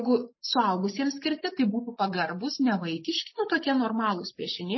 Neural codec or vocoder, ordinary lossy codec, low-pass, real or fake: none; MP3, 24 kbps; 7.2 kHz; real